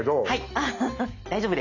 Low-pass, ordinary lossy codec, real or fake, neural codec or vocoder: 7.2 kHz; none; real; none